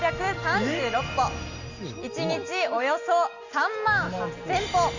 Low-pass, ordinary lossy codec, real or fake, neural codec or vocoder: 7.2 kHz; Opus, 64 kbps; real; none